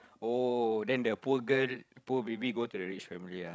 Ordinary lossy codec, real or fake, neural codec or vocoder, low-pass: none; fake; codec, 16 kHz, 16 kbps, FreqCodec, larger model; none